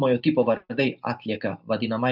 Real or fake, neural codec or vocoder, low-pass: real; none; 5.4 kHz